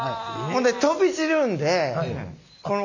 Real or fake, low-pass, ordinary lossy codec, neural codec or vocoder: real; 7.2 kHz; none; none